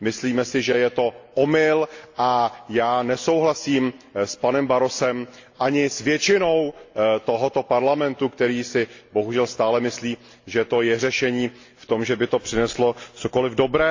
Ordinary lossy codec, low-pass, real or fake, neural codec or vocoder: AAC, 48 kbps; 7.2 kHz; real; none